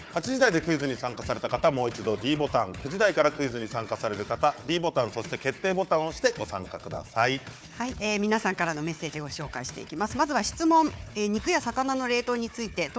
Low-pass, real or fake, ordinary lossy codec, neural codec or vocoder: none; fake; none; codec, 16 kHz, 4 kbps, FunCodec, trained on Chinese and English, 50 frames a second